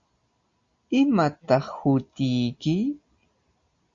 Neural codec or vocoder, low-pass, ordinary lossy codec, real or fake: none; 7.2 kHz; Opus, 64 kbps; real